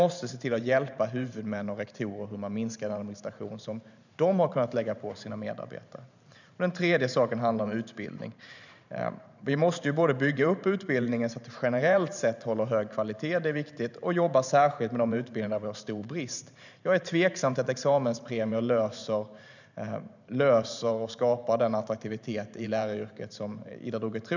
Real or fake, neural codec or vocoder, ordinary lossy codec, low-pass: fake; vocoder, 44.1 kHz, 128 mel bands every 512 samples, BigVGAN v2; none; 7.2 kHz